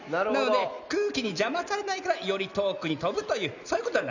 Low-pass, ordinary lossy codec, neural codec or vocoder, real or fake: 7.2 kHz; none; none; real